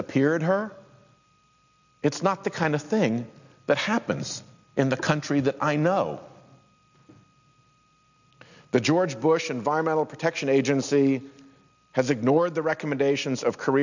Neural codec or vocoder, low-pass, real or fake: none; 7.2 kHz; real